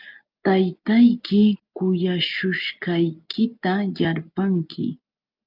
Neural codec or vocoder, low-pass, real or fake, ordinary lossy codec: none; 5.4 kHz; real; Opus, 32 kbps